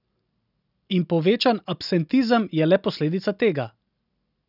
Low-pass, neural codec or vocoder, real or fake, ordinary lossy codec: 5.4 kHz; none; real; none